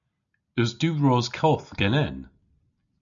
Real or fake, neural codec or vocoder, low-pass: real; none; 7.2 kHz